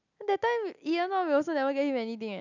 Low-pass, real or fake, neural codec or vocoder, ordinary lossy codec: 7.2 kHz; real; none; none